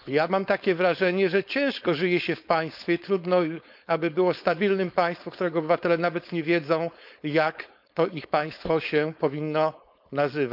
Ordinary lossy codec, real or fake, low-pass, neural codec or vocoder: none; fake; 5.4 kHz; codec, 16 kHz, 4.8 kbps, FACodec